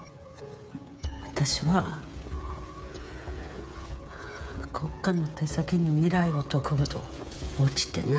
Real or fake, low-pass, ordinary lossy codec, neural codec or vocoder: fake; none; none; codec, 16 kHz, 8 kbps, FreqCodec, smaller model